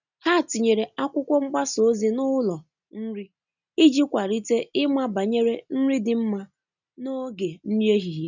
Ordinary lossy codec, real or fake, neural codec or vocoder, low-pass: none; real; none; 7.2 kHz